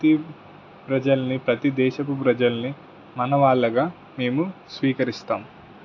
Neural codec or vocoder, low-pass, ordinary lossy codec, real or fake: none; 7.2 kHz; AAC, 48 kbps; real